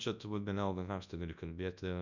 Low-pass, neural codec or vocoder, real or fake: 7.2 kHz; codec, 24 kHz, 0.9 kbps, WavTokenizer, large speech release; fake